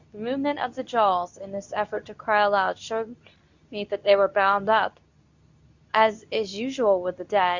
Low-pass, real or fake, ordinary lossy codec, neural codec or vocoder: 7.2 kHz; fake; Opus, 64 kbps; codec, 24 kHz, 0.9 kbps, WavTokenizer, medium speech release version 2